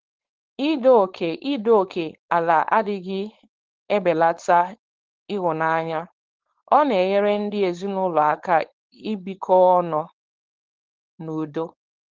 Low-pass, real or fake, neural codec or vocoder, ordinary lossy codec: 7.2 kHz; fake; codec, 16 kHz, 4.8 kbps, FACodec; Opus, 16 kbps